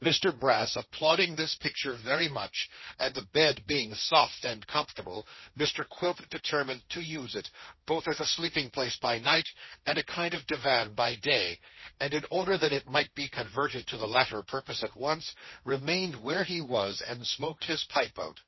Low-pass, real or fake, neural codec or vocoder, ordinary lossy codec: 7.2 kHz; fake; codec, 16 kHz, 1.1 kbps, Voila-Tokenizer; MP3, 24 kbps